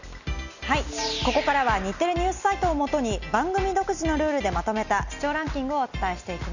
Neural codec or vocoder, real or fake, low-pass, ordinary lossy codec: none; real; 7.2 kHz; none